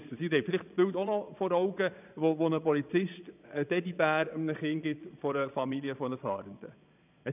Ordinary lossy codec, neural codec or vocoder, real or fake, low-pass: none; vocoder, 22.05 kHz, 80 mel bands, Vocos; fake; 3.6 kHz